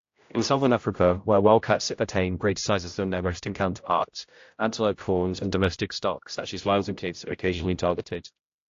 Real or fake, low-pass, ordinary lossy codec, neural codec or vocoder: fake; 7.2 kHz; AAC, 48 kbps; codec, 16 kHz, 0.5 kbps, X-Codec, HuBERT features, trained on general audio